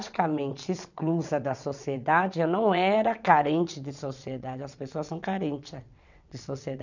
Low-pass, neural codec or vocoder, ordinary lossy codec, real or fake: 7.2 kHz; vocoder, 22.05 kHz, 80 mel bands, WaveNeXt; none; fake